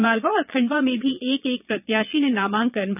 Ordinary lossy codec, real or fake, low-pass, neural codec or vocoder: none; fake; 3.6 kHz; vocoder, 22.05 kHz, 80 mel bands, Vocos